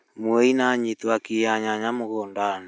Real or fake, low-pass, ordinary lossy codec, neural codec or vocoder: real; none; none; none